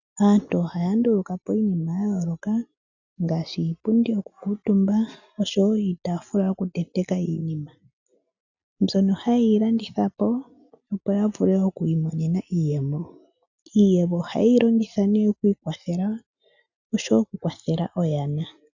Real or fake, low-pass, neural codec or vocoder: real; 7.2 kHz; none